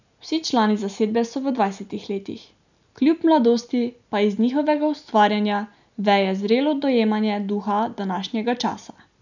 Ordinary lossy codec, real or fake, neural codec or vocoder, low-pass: none; real; none; 7.2 kHz